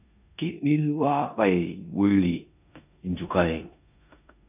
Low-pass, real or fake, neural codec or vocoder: 3.6 kHz; fake; codec, 16 kHz in and 24 kHz out, 0.9 kbps, LongCat-Audio-Codec, four codebook decoder